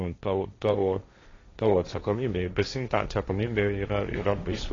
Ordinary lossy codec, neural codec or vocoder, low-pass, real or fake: AAC, 32 kbps; codec, 16 kHz, 1.1 kbps, Voila-Tokenizer; 7.2 kHz; fake